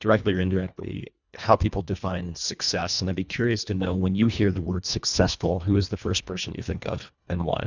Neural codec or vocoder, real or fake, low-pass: codec, 24 kHz, 1.5 kbps, HILCodec; fake; 7.2 kHz